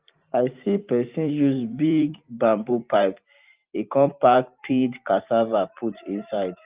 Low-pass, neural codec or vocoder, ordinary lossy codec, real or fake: 3.6 kHz; vocoder, 44.1 kHz, 128 mel bands every 512 samples, BigVGAN v2; Opus, 24 kbps; fake